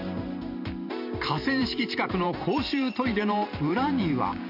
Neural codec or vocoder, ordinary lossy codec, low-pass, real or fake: vocoder, 44.1 kHz, 128 mel bands every 512 samples, BigVGAN v2; none; 5.4 kHz; fake